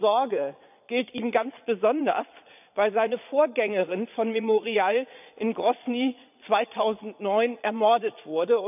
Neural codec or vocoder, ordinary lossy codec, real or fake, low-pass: vocoder, 22.05 kHz, 80 mel bands, Vocos; none; fake; 3.6 kHz